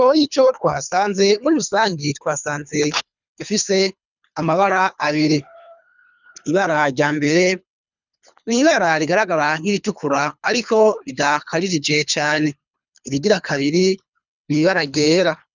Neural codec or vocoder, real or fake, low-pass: codec, 24 kHz, 3 kbps, HILCodec; fake; 7.2 kHz